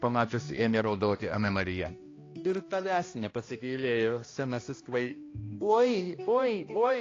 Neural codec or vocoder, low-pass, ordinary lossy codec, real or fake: codec, 16 kHz, 1 kbps, X-Codec, HuBERT features, trained on balanced general audio; 7.2 kHz; AAC, 32 kbps; fake